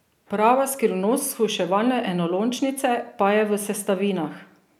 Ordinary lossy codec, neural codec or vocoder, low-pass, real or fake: none; none; none; real